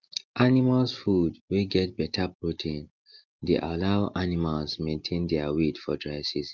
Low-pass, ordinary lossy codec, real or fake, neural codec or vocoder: 7.2 kHz; Opus, 24 kbps; real; none